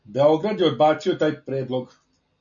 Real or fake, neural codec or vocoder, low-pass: real; none; 7.2 kHz